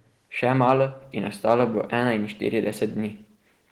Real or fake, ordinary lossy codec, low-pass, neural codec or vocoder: real; Opus, 16 kbps; 19.8 kHz; none